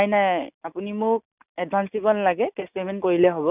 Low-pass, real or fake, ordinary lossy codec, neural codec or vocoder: 3.6 kHz; real; none; none